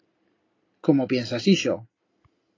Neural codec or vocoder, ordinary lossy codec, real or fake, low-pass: none; AAC, 32 kbps; real; 7.2 kHz